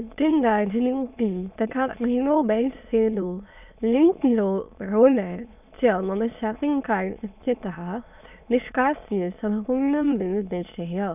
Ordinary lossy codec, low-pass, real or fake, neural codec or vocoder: none; 3.6 kHz; fake; autoencoder, 22.05 kHz, a latent of 192 numbers a frame, VITS, trained on many speakers